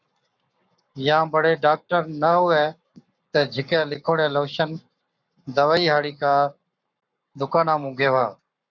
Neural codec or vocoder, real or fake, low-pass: codec, 44.1 kHz, 7.8 kbps, Pupu-Codec; fake; 7.2 kHz